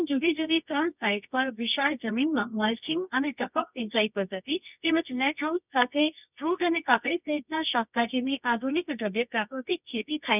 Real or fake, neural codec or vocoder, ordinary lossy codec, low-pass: fake; codec, 24 kHz, 0.9 kbps, WavTokenizer, medium music audio release; none; 3.6 kHz